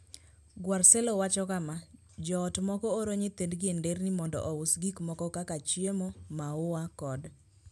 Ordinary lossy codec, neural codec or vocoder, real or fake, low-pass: none; none; real; none